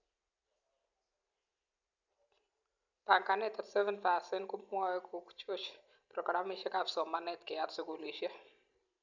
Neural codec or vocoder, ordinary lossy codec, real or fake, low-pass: none; none; real; 7.2 kHz